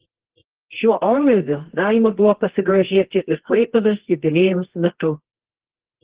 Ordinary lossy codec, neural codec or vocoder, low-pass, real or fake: Opus, 16 kbps; codec, 24 kHz, 0.9 kbps, WavTokenizer, medium music audio release; 3.6 kHz; fake